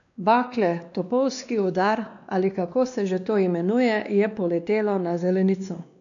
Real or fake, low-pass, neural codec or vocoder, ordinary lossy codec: fake; 7.2 kHz; codec, 16 kHz, 2 kbps, X-Codec, WavLM features, trained on Multilingual LibriSpeech; none